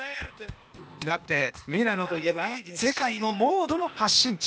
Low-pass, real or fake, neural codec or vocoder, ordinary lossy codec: none; fake; codec, 16 kHz, 0.8 kbps, ZipCodec; none